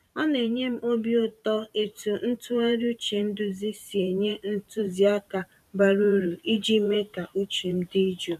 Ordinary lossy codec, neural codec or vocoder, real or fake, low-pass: none; vocoder, 44.1 kHz, 128 mel bands every 512 samples, BigVGAN v2; fake; 14.4 kHz